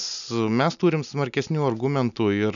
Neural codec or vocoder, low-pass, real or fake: none; 7.2 kHz; real